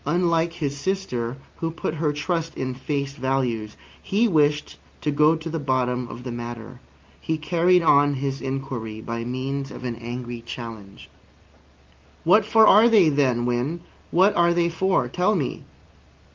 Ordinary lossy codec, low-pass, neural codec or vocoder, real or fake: Opus, 32 kbps; 7.2 kHz; none; real